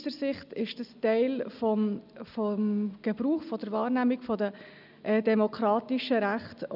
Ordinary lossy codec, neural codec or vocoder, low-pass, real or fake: none; none; 5.4 kHz; real